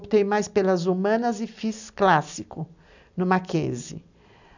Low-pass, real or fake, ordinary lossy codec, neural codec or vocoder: 7.2 kHz; real; none; none